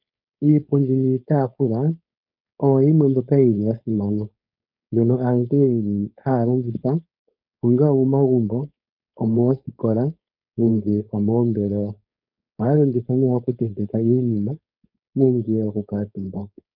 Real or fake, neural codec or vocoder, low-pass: fake; codec, 16 kHz, 4.8 kbps, FACodec; 5.4 kHz